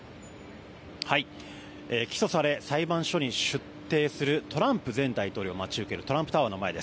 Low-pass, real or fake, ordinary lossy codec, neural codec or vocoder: none; real; none; none